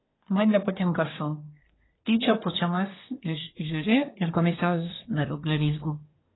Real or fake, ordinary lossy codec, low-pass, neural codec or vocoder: fake; AAC, 16 kbps; 7.2 kHz; codec, 24 kHz, 1 kbps, SNAC